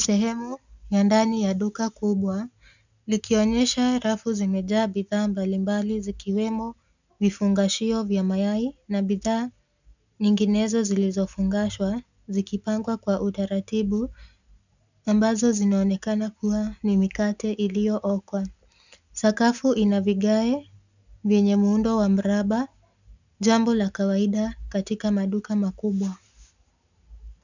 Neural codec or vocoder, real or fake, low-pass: none; real; 7.2 kHz